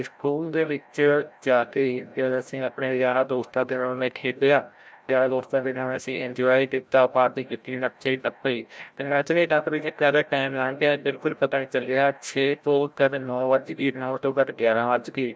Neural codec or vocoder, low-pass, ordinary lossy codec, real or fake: codec, 16 kHz, 0.5 kbps, FreqCodec, larger model; none; none; fake